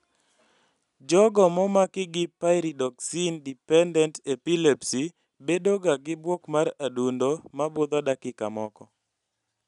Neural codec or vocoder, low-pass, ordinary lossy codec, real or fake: none; 10.8 kHz; none; real